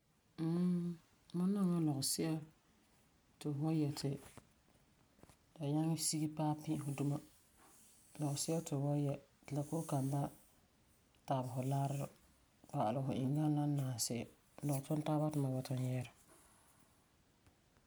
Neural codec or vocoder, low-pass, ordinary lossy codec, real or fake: none; none; none; real